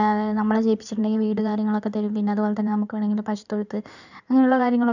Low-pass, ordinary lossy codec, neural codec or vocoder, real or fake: 7.2 kHz; none; codec, 16 kHz in and 24 kHz out, 2.2 kbps, FireRedTTS-2 codec; fake